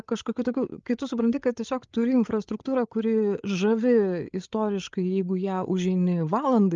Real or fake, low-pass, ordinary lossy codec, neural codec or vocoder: fake; 7.2 kHz; Opus, 24 kbps; codec, 16 kHz, 8 kbps, FreqCodec, larger model